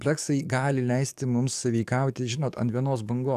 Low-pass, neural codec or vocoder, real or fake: 14.4 kHz; codec, 44.1 kHz, 7.8 kbps, DAC; fake